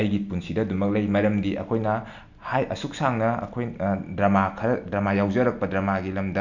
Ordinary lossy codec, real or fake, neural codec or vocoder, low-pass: none; real; none; 7.2 kHz